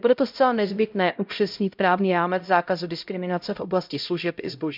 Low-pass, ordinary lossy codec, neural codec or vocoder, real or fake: 5.4 kHz; none; codec, 16 kHz, 0.5 kbps, X-Codec, HuBERT features, trained on LibriSpeech; fake